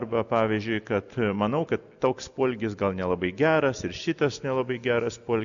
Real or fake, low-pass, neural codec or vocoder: real; 7.2 kHz; none